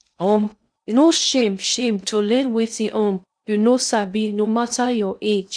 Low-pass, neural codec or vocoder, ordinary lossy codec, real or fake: 9.9 kHz; codec, 16 kHz in and 24 kHz out, 0.6 kbps, FocalCodec, streaming, 2048 codes; none; fake